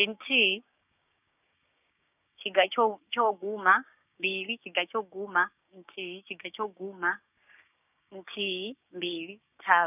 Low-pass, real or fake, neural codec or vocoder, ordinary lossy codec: 3.6 kHz; fake; codec, 16 kHz, 6 kbps, DAC; none